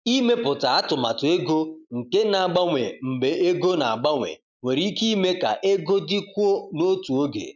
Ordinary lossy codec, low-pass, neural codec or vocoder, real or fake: none; 7.2 kHz; none; real